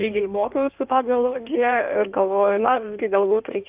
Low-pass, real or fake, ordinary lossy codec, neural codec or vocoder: 3.6 kHz; fake; Opus, 24 kbps; codec, 16 kHz in and 24 kHz out, 1.1 kbps, FireRedTTS-2 codec